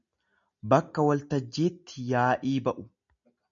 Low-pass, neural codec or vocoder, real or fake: 7.2 kHz; none; real